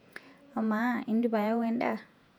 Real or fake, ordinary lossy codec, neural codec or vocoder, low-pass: fake; none; vocoder, 48 kHz, 128 mel bands, Vocos; 19.8 kHz